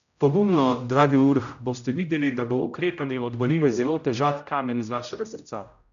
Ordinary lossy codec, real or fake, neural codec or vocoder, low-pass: none; fake; codec, 16 kHz, 0.5 kbps, X-Codec, HuBERT features, trained on general audio; 7.2 kHz